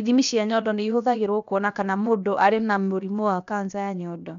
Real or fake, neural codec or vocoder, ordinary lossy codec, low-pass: fake; codec, 16 kHz, 0.7 kbps, FocalCodec; none; 7.2 kHz